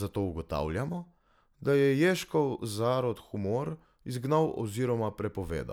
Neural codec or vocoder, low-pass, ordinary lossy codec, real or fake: none; 19.8 kHz; none; real